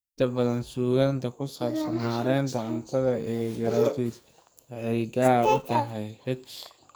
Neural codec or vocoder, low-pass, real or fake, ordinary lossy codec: codec, 44.1 kHz, 2.6 kbps, SNAC; none; fake; none